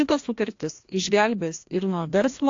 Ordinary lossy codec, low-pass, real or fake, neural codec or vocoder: AAC, 48 kbps; 7.2 kHz; fake; codec, 16 kHz, 1 kbps, FreqCodec, larger model